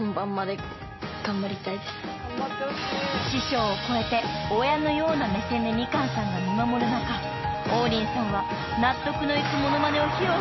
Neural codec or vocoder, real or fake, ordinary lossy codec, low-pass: none; real; MP3, 24 kbps; 7.2 kHz